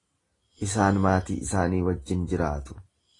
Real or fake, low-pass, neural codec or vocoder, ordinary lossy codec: real; 10.8 kHz; none; AAC, 32 kbps